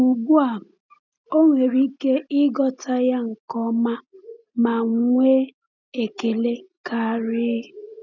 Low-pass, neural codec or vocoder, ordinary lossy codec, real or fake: 7.2 kHz; none; none; real